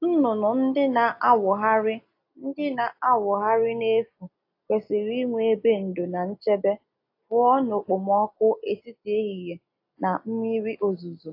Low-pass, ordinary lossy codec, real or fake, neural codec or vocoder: 5.4 kHz; AAC, 32 kbps; real; none